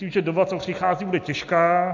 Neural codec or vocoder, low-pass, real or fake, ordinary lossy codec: none; 7.2 kHz; real; MP3, 48 kbps